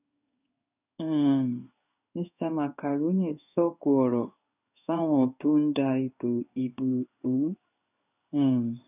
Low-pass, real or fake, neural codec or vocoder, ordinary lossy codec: 3.6 kHz; fake; codec, 16 kHz in and 24 kHz out, 1 kbps, XY-Tokenizer; none